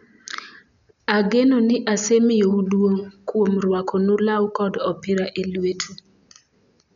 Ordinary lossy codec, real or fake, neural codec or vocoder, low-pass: none; real; none; 7.2 kHz